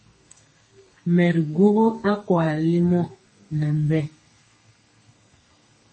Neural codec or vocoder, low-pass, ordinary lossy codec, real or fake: codec, 32 kHz, 1.9 kbps, SNAC; 10.8 kHz; MP3, 32 kbps; fake